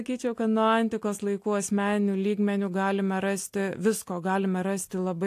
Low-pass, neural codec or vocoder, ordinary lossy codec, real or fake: 14.4 kHz; none; AAC, 64 kbps; real